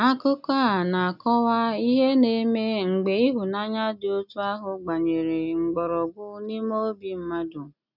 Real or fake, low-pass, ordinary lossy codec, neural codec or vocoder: real; 5.4 kHz; none; none